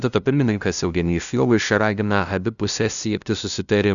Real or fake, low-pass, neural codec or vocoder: fake; 7.2 kHz; codec, 16 kHz, 0.5 kbps, FunCodec, trained on LibriTTS, 25 frames a second